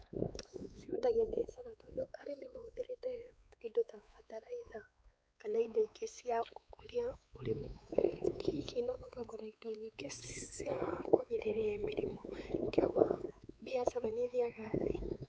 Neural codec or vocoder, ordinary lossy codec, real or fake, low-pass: codec, 16 kHz, 4 kbps, X-Codec, WavLM features, trained on Multilingual LibriSpeech; none; fake; none